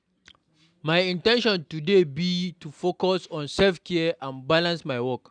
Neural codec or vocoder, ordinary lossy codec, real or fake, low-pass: none; none; real; 9.9 kHz